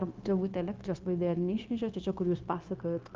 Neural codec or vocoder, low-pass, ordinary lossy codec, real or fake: codec, 16 kHz, 0.9 kbps, LongCat-Audio-Codec; 7.2 kHz; Opus, 24 kbps; fake